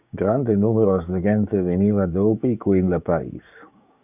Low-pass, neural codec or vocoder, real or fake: 3.6 kHz; codec, 16 kHz, 6 kbps, DAC; fake